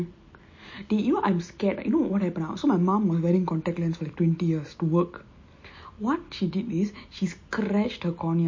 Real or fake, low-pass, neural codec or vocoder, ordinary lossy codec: real; 7.2 kHz; none; MP3, 32 kbps